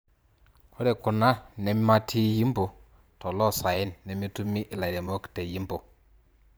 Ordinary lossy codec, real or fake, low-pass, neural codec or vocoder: none; fake; none; vocoder, 44.1 kHz, 128 mel bands every 512 samples, BigVGAN v2